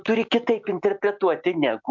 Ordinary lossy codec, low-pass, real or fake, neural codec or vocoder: MP3, 64 kbps; 7.2 kHz; real; none